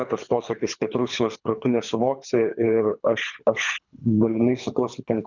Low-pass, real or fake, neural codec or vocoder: 7.2 kHz; fake; codec, 24 kHz, 3 kbps, HILCodec